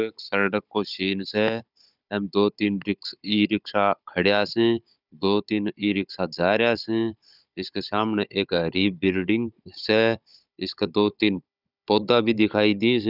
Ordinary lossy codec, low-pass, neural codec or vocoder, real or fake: none; 5.4 kHz; codec, 16 kHz, 16 kbps, FunCodec, trained on Chinese and English, 50 frames a second; fake